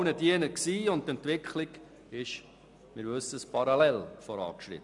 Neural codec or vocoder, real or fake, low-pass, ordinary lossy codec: none; real; 10.8 kHz; MP3, 96 kbps